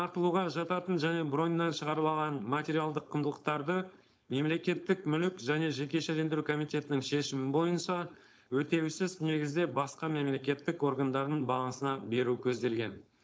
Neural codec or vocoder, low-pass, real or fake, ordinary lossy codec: codec, 16 kHz, 4.8 kbps, FACodec; none; fake; none